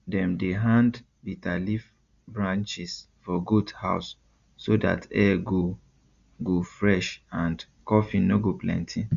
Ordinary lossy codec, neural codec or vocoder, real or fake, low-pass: Opus, 64 kbps; none; real; 7.2 kHz